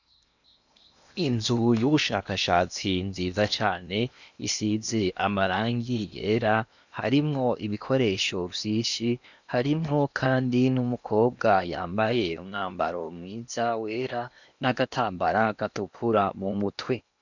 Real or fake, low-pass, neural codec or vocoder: fake; 7.2 kHz; codec, 16 kHz in and 24 kHz out, 0.8 kbps, FocalCodec, streaming, 65536 codes